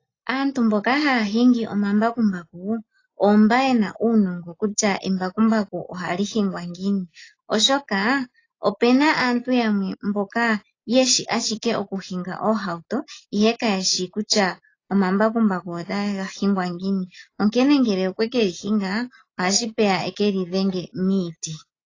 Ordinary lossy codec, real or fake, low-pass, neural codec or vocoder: AAC, 32 kbps; real; 7.2 kHz; none